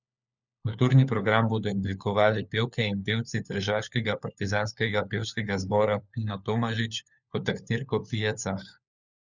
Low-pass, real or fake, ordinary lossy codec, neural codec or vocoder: 7.2 kHz; fake; none; codec, 16 kHz, 4 kbps, FunCodec, trained on LibriTTS, 50 frames a second